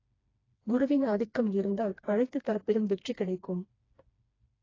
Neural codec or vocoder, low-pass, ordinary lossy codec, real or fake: codec, 16 kHz, 2 kbps, FreqCodec, smaller model; 7.2 kHz; AAC, 32 kbps; fake